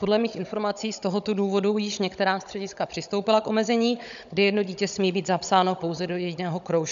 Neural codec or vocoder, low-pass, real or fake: codec, 16 kHz, 16 kbps, FunCodec, trained on Chinese and English, 50 frames a second; 7.2 kHz; fake